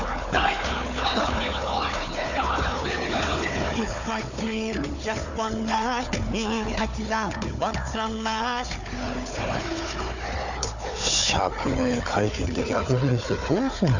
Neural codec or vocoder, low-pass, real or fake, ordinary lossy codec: codec, 16 kHz, 4 kbps, FunCodec, trained on Chinese and English, 50 frames a second; 7.2 kHz; fake; none